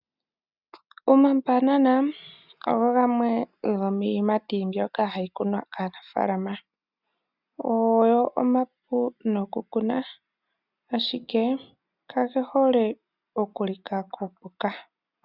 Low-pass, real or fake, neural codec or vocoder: 5.4 kHz; real; none